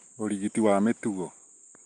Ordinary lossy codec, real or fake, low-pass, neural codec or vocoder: Opus, 32 kbps; real; 9.9 kHz; none